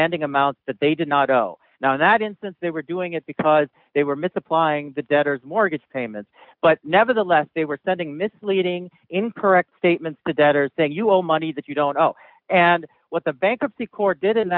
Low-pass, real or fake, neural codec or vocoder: 5.4 kHz; real; none